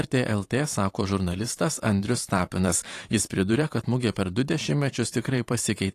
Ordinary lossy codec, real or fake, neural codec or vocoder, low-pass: AAC, 48 kbps; fake; vocoder, 44.1 kHz, 128 mel bands every 512 samples, BigVGAN v2; 14.4 kHz